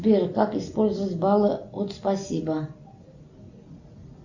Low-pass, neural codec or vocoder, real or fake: 7.2 kHz; none; real